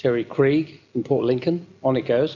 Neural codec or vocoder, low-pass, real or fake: none; 7.2 kHz; real